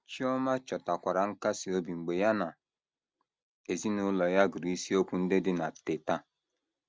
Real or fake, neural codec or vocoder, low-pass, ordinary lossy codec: real; none; none; none